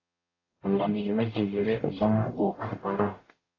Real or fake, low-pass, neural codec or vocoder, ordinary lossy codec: fake; 7.2 kHz; codec, 44.1 kHz, 0.9 kbps, DAC; AAC, 32 kbps